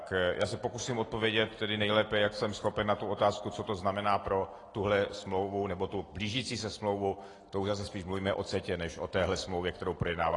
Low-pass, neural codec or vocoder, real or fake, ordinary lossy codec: 10.8 kHz; none; real; AAC, 32 kbps